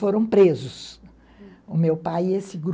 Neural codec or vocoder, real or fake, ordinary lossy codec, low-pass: none; real; none; none